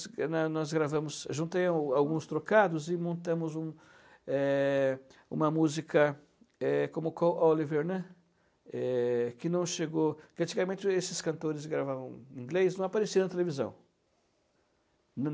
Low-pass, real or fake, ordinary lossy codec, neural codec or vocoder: none; real; none; none